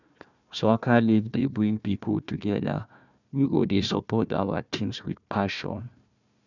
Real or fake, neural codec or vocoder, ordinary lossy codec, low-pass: fake; codec, 16 kHz, 1 kbps, FunCodec, trained on Chinese and English, 50 frames a second; none; 7.2 kHz